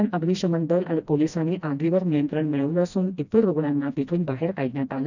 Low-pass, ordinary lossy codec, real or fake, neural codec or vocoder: 7.2 kHz; none; fake; codec, 16 kHz, 1 kbps, FreqCodec, smaller model